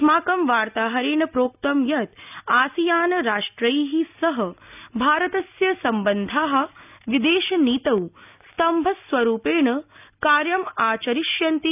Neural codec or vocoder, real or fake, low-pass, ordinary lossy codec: none; real; 3.6 kHz; none